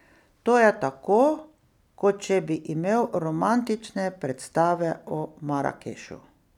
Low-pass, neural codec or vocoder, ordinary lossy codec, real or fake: 19.8 kHz; none; none; real